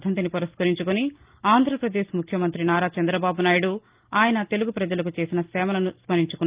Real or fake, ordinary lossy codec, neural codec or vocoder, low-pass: real; Opus, 32 kbps; none; 3.6 kHz